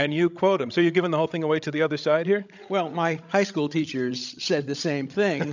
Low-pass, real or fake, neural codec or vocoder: 7.2 kHz; fake; codec, 16 kHz, 16 kbps, FreqCodec, larger model